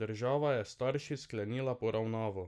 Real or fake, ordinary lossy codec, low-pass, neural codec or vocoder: real; none; none; none